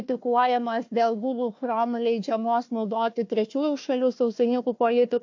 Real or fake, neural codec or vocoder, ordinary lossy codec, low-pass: fake; codec, 16 kHz, 1 kbps, FunCodec, trained on Chinese and English, 50 frames a second; AAC, 48 kbps; 7.2 kHz